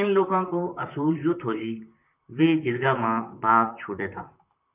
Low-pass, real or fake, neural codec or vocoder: 3.6 kHz; fake; vocoder, 44.1 kHz, 128 mel bands, Pupu-Vocoder